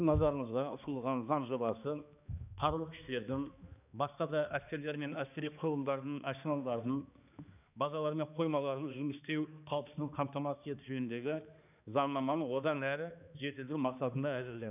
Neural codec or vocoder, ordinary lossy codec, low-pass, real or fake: codec, 16 kHz, 2 kbps, X-Codec, HuBERT features, trained on balanced general audio; none; 3.6 kHz; fake